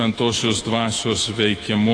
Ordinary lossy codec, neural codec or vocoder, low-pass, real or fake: AAC, 32 kbps; autoencoder, 48 kHz, 128 numbers a frame, DAC-VAE, trained on Japanese speech; 9.9 kHz; fake